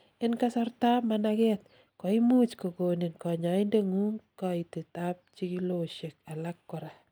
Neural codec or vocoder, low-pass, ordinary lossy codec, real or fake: none; none; none; real